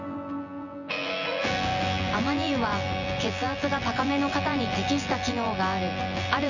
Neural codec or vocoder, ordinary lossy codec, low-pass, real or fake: vocoder, 24 kHz, 100 mel bands, Vocos; none; 7.2 kHz; fake